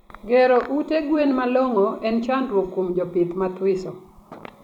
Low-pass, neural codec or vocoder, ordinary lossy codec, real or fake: 19.8 kHz; vocoder, 44.1 kHz, 128 mel bands every 256 samples, BigVGAN v2; none; fake